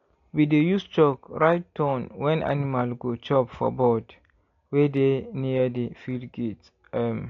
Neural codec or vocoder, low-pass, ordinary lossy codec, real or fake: none; 7.2 kHz; AAC, 48 kbps; real